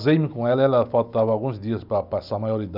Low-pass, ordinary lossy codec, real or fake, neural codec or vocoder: 5.4 kHz; none; real; none